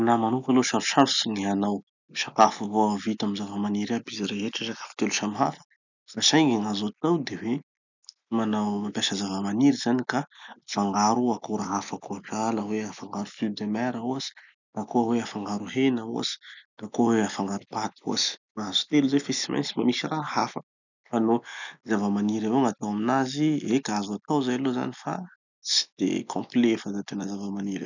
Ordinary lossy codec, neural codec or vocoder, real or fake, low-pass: none; none; real; 7.2 kHz